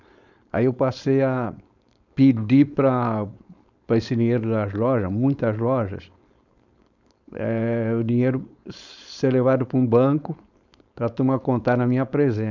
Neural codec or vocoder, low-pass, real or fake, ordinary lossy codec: codec, 16 kHz, 4.8 kbps, FACodec; 7.2 kHz; fake; none